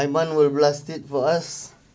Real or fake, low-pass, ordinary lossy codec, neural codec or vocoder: real; none; none; none